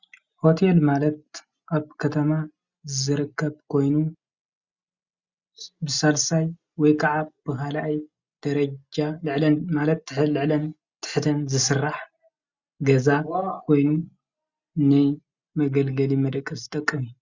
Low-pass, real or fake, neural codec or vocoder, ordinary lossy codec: 7.2 kHz; real; none; Opus, 64 kbps